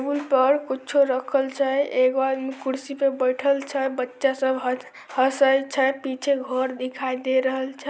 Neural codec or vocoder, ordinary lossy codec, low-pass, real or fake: none; none; none; real